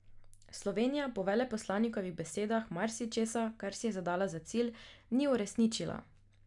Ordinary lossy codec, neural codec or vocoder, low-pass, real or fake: none; none; 10.8 kHz; real